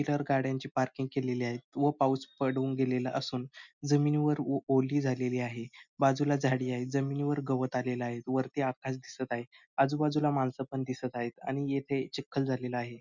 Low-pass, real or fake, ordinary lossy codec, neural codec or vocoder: 7.2 kHz; real; none; none